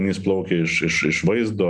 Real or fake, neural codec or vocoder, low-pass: real; none; 9.9 kHz